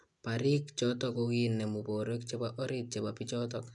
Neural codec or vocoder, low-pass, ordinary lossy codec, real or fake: none; 10.8 kHz; none; real